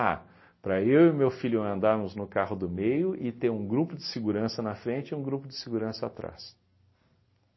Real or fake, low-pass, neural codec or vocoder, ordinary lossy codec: real; 7.2 kHz; none; MP3, 24 kbps